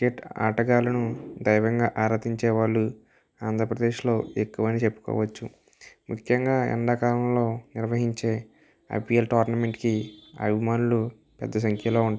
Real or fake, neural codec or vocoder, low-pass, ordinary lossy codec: real; none; none; none